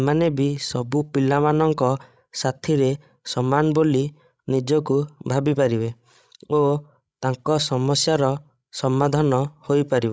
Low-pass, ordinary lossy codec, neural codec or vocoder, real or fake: none; none; codec, 16 kHz, 16 kbps, FreqCodec, larger model; fake